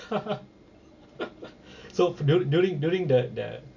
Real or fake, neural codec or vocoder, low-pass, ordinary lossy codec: real; none; 7.2 kHz; none